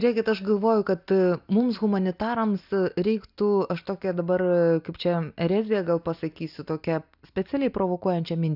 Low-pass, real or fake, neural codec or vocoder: 5.4 kHz; real; none